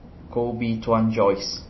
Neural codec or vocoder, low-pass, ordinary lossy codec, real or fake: none; 7.2 kHz; MP3, 24 kbps; real